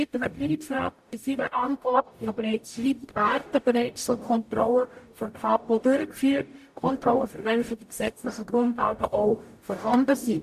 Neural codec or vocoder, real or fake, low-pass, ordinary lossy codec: codec, 44.1 kHz, 0.9 kbps, DAC; fake; 14.4 kHz; AAC, 96 kbps